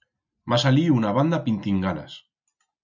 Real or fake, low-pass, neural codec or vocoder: real; 7.2 kHz; none